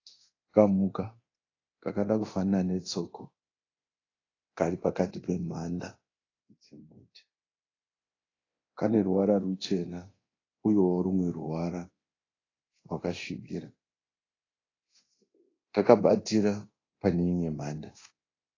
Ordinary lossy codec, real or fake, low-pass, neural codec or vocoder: AAC, 32 kbps; fake; 7.2 kHz; codec, 24 kHz, 0.9 kbps, DualCodec